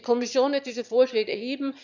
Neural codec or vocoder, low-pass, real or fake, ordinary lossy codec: autoencoder, 22.05 kHz, a latent of 192 numbers a frame, VITS, trained on one speaker; 7.2 kHz; fake; none